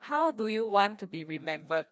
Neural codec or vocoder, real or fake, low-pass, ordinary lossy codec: codec, 16 kHz, 1 kbps, FreqCodec, larger model; fake; none; none